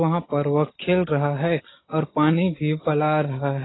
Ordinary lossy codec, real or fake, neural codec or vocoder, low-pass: AAC, 16 kbps; real; none; 7.2 kHz